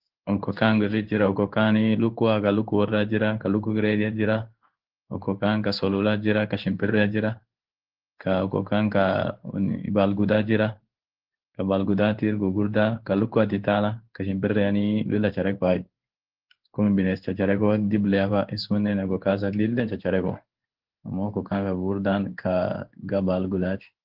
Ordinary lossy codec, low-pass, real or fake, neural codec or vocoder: Opus, 16 kbps; 5.4 kHz; fake; codec, 16 kHz in and 24 kHz out, 1 kbps, XY-Tokenizer